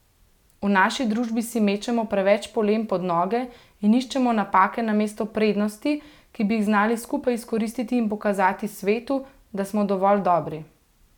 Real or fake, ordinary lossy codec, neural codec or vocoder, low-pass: real; none; none; 19.8 kHz